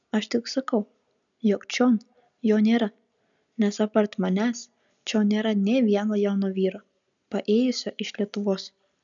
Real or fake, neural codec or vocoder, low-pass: real; none; 7.2 kHz